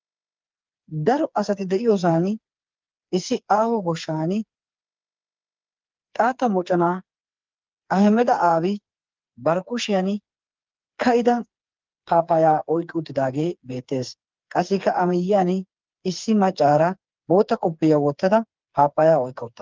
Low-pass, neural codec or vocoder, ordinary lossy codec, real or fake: 7.2 kHz; codec, 16 kHz, 4 kbps, FreqCodec, smaller model; Opus, 32 kbps; fake